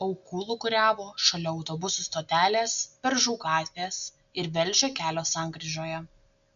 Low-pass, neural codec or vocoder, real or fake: 7.2 kHz; none; real